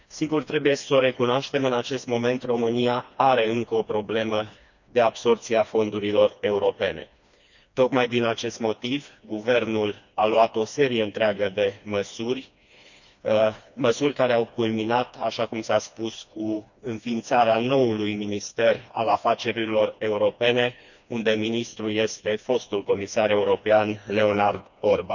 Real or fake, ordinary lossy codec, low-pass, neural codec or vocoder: fake; none; 7.2 kHz; codec, 16 kHz, 2 kbps, FreqCodec, smaller model